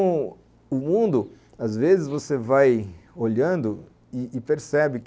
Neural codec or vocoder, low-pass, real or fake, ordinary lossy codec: none; none; real; none